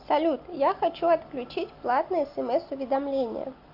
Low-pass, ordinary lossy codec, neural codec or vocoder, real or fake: 5.4 kHz; AAC, 48 kbps; none; real